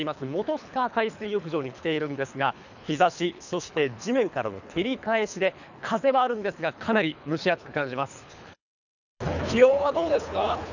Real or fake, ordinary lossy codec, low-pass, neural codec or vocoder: fake; none; 7.2 kHz; codec, 24 kHz, 3 kbps, HILCodec